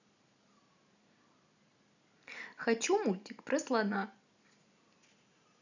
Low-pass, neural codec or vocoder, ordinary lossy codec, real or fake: 7.2 kHz; none; none; real